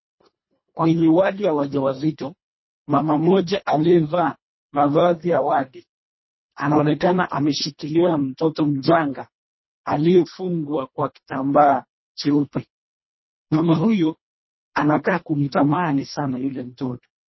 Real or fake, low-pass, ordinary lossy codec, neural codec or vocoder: fake; 7.2 kHz; MP3, 24 kbps; codec, 24 kHz, 1.5 kbps, HILCodec